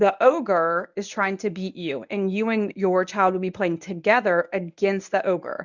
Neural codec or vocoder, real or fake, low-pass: codec, 24 kHz, 0.9 kbps, WavTokenizer, medium speech release version 1; fake; 7.2 kHz